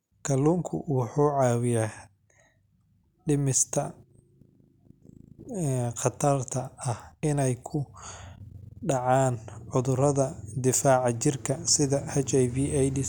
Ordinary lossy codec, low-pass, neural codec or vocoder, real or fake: none; 19.8 kHz; none; real